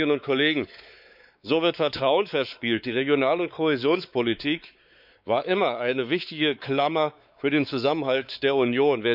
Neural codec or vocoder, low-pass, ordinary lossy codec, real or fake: codec, 16 kHz, 4 kbps, X-Codec, WavLM features, trained on Multilingual LibriSpeech; 5.4 kHz; none; fake